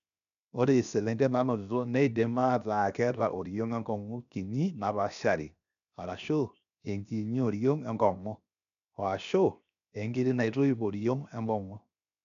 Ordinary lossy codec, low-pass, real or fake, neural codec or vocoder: none; 7.2 kHz; fake; codec, 16 kHz, 0.7 kbps, FocalCodec